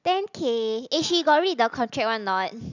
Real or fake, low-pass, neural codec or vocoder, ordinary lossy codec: real; 7.2 kHz; none; none